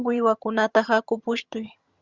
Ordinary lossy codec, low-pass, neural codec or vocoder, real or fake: Opus, 64 kbps; 7.2 kHz; vocoder, 22.05 kHz, 80 mel bands, HiFi-GAN; fake